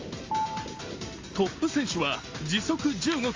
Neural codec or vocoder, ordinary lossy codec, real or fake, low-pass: none; Opus, 32 kbps; real; 7.2 kHz